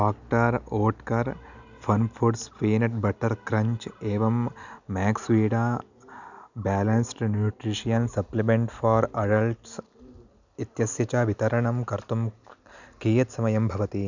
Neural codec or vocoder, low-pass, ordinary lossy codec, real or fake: none; 7.2 kHz; none; real